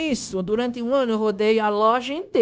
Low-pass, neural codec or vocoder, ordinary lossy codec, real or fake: none; codec, 16 kHz, 0.9 kbps, LongCat-Audio-Codec; none; fake